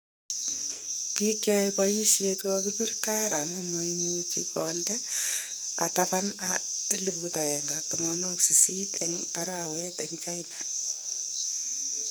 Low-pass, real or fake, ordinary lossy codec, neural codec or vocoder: none; fake; none; codec, 44.1 kHz, 2.6 kbps, SNAC